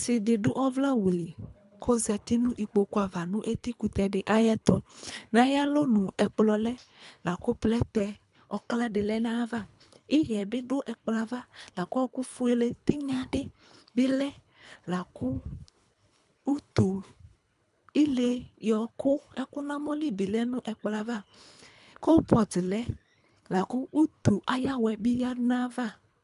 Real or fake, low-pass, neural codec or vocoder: fake; 10.8 kHz; codec, 24 kHz, 3 kbps, HILCodec